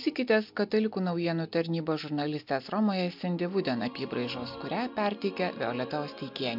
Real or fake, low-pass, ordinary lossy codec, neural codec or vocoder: real; 5.4 kHz; MP3, 48 kbps; none